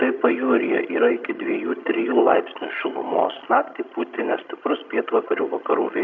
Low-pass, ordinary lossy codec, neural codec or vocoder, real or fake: 7.2 kHz; MP3, 48 kbps; vocoder, 22.05 kHz, 80 mel bands, HiFi-GAN; fake